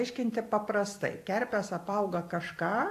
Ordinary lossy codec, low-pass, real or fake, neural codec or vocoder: AAC, 64 kbps; 14.4 kHz; fake; vocoder, 44.1 kHz, 128 mel bands every 256 samples, BigVGAN v2